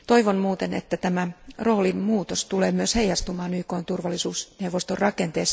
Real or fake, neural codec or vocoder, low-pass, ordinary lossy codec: real; none; none; none